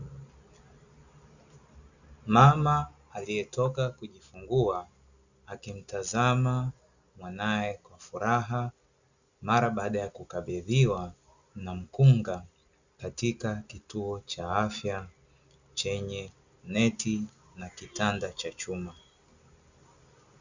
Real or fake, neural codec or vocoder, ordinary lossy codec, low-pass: real; none; Opus, 64 kbps; 7.2 kHz